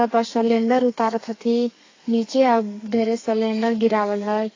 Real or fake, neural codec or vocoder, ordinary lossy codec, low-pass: fake; codec, 32 kHz, 1.9 kbps, SNAC; AAC, 32 kbps; 7.2 kHz